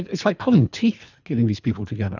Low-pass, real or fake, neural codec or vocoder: 7.2 kHz; fake; codec, 24 kHz, 3 kbps, HILCodec